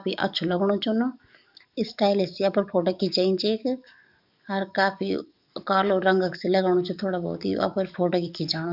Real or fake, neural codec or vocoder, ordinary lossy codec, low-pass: fake; codec, 44.1 kHz, 7.8 kbps, DAC; none; 5.4 kHz